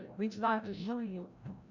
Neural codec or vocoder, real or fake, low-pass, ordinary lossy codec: codec, 16 kHz, 0.5 kbps, FreqCodec, larger model; fake; 7.2 kHz; none